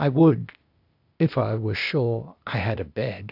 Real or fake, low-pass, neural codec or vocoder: fake; 5.4 kHz; codec, 16 kHz, 0.8 kbps, ZipCodec